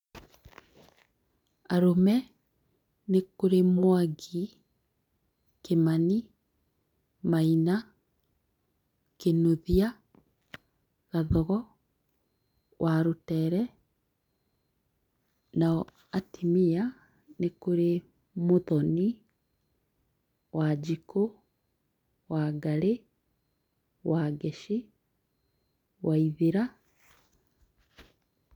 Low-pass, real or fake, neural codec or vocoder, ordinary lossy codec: 19.8 kHz; real; none; none